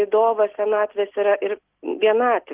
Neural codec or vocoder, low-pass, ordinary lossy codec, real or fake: none; 3.6 kHz; Opus, 16 kbps; real